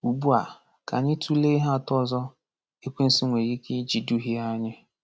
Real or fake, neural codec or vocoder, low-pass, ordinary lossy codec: real; none; none; none